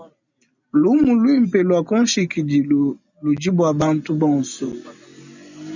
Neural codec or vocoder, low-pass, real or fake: none; 7.2 kHz; real